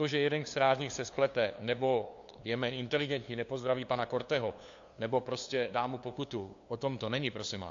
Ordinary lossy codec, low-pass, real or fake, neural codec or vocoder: AAC, 48 kbps; 7.2 kHz; fake; codec, 16 kHz, 2 kbps, FunCodec, trained on LibriTTS, 25 frames a second